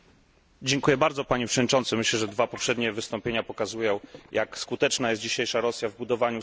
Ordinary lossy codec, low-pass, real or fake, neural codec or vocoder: none; none; real; none